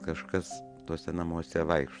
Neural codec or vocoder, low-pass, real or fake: none; 9.9 kHz; real